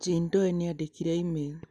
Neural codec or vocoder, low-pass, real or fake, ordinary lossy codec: none; none; real; none